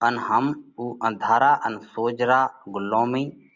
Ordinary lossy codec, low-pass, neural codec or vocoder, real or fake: Opus, 64 kbps; 7.2 kHz; none; real